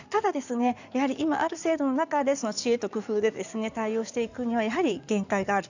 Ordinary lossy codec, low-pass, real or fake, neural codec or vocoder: none; 7.2 kHz; fake; codec, 16 kHz in and 24 kHz out, 2.2 kbps, FireRedTTS-2 codec